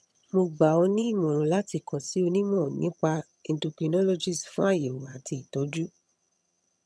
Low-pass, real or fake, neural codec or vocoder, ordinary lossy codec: none; fake; vocoder, 22.05 kHz, 80 mel bands, HiFi-GAN; none